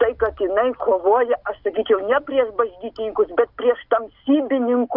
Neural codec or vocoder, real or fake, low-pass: none; real; 5.4 kHz